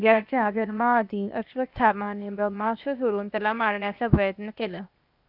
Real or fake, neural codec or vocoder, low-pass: fake; codec, 16 kHz, 0.8 kbps, ZipCodec; 5.4 kHz